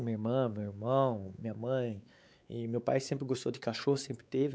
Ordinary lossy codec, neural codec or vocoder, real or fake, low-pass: none; codec, 16 kHz, 4 kbps, X-Codec, WavLM features, trained on Multilingual LibriSpeech; fake; none